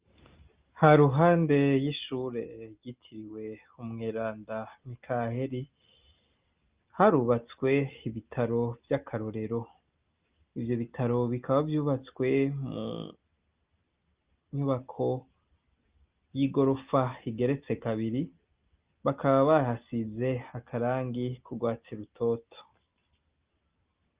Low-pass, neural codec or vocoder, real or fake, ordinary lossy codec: 3.6 kHz; none; real; Opus, 32 kbps